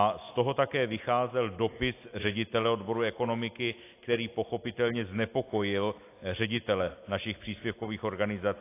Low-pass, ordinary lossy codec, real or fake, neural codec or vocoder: 3.6 kHz; AAC, 24 kbps; real; none